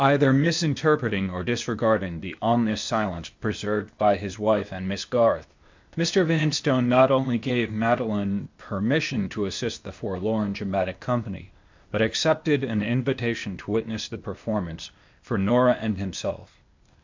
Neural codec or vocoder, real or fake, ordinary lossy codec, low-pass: codec, 16 kHz, 0.8 kbps, ZipCodec; fake; MP3, 64 kbps; 7.2 kHz